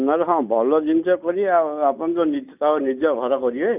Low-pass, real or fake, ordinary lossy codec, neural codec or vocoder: 3.6 kHz; real; none; none